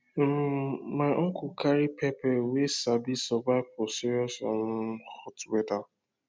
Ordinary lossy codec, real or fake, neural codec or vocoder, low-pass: none; real; none; none